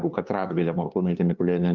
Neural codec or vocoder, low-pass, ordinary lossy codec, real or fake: codec, 16 kHz, 2 kbps, FunCodec, trained on LibriTTS, 25 frames a second; 7.2 kHz; Opus, 24 kbps; fake